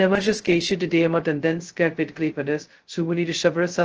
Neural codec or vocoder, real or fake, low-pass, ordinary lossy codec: codec, 16 kHz, 0.2 kbps, FocalCodec; fake; 7.2 kHz; Opus, 16 kbps